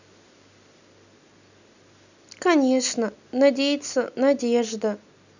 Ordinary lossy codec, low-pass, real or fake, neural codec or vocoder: none; 7.2 kHz; real; none